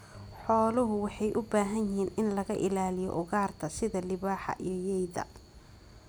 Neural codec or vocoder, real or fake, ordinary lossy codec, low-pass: none; real; none; none